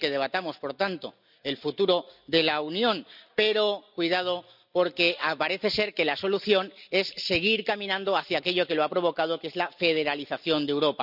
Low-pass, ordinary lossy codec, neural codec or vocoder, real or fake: 5.4 kHz; none; none; real